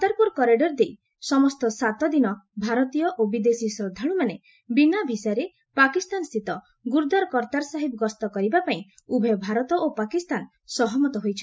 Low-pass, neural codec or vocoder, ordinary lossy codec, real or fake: none; none; none; real